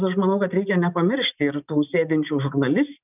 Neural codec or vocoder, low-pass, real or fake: none; 3.6 kHz; real